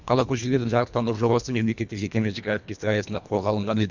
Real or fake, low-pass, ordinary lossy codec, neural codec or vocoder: fake; 7.2 kHz; none; codec, 24 kHz, 1.5 kbps, HILCodec